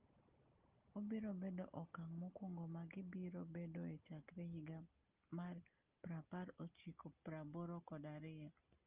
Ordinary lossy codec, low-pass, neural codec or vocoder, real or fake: Opus, 32 kbps; 3.6 kHz; none; real